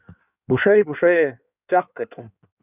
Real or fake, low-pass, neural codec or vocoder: fake; 3.6 kHz; codec, 16 kHz in and 24 kHz out, 1.1 kbps, FireRedTTS-2 codec